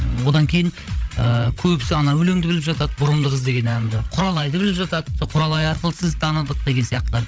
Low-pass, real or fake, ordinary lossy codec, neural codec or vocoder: none; fake; none; codec, 16 kHz, 8 kbps, FreqCodec, larger model